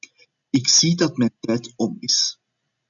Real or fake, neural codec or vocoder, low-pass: real; none; 7.2 kHz